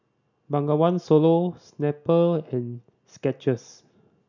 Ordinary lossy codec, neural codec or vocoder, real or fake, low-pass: none; none; real; 7.2 kHz